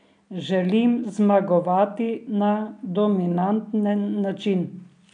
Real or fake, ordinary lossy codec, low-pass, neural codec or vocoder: real; none; 9.9 kHz; none